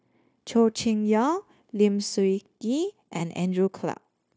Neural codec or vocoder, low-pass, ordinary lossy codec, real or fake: codec, 16 kHz, 0.9 kbps, LongCat-Audio-Codec; none; none; fake